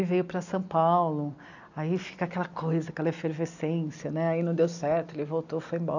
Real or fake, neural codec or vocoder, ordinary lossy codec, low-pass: real; none; none; 7.2 kHz